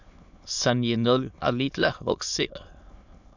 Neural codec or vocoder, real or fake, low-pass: autoencoder, 22.05 kHz, a latent of 192 numbers a frame, VITS, trained on many speakers; fake; 7.2 kHz